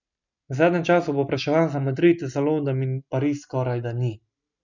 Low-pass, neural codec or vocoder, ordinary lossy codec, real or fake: 7.2 kHz; none; none; real